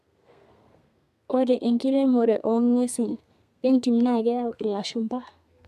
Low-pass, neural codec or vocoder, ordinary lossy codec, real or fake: 14.4 kHz; codec, 32 kHz, 1.9 kbps, SNAC; none; fake